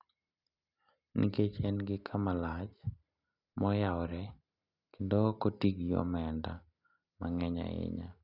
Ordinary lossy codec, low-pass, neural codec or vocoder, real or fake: none; 5.4 kHz; none; real